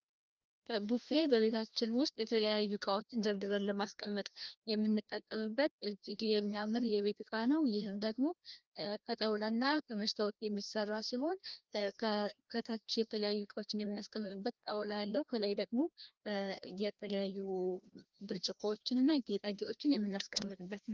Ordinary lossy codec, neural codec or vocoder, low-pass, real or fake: Opus, 32 kbps; codec, 16 kHz, 1 kbps, FreqCodec, larger model; 7.2 kHz; fake